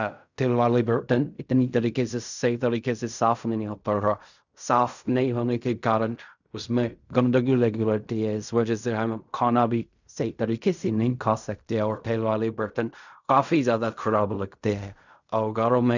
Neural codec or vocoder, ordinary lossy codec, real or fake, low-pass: codec, 16 kHz in and 24 kHz out, 0.4 kbps, LongCat-Audio-Codec, fine tuned four codebook decoder; none; fake; 7.2 kHz